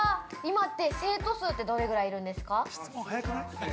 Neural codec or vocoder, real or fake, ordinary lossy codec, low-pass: none; real; none; none